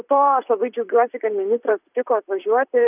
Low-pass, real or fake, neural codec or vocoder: 3.6 kHz; fake; vocoder, 44.1 kHz, 128 mel bands, Pupu-Vocoder